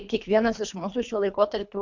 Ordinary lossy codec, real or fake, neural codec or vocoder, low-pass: MP3, 64 kbps; fake; codec, 24 kHz, 3 kbps, HILCodec; 7.2 kHz